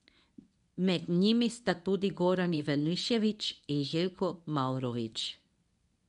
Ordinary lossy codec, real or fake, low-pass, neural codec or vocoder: none; fake; 10.8 kHz; codec, 24 kHz, 0.9 kbps, WavTokenizer, medium speech release version 1